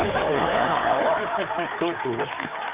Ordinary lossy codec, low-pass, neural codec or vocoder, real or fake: Opus, 24 kbps; 3.6 kHz; codec, 16 kHz, 4 kbps, FreqCodec, larger model; fake